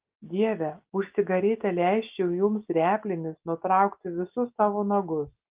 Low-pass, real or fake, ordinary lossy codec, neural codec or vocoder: 3.6 kHz; real; Opus, 32 kbps; none